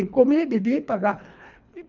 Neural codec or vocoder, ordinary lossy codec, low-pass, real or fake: codec, 24 kHz, 3 kbps, HILCodec; none; 7.2 kHz; fake